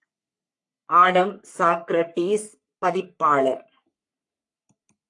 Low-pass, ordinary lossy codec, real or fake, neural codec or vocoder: 10.8 kHz; AAC, 64 kbps; fake; codec, 44.1 kHz, 3.4 kbps, Pupu-Codec